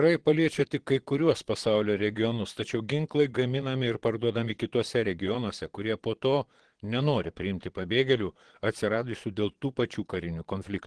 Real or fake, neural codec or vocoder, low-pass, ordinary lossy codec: fake; vocoder, 22.05 kHz, 80 mel bands, Vocos; 9.9 kHz; Opus, 16 kbps